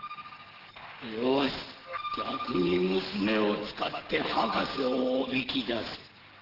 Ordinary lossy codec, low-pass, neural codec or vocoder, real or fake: Opus, 16 kbps; 5.4 kHz; codec, 44.1 kHz, 7.8 kbps, DAC; fake